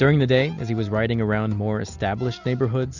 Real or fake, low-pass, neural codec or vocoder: real; 7.2 kHz; none